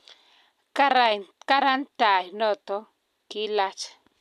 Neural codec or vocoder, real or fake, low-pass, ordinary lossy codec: none; real; 14.4 kHz; none